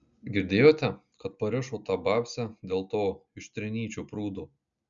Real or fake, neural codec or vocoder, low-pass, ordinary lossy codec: real; none; 7.2 kHz; MP3, 96 kbps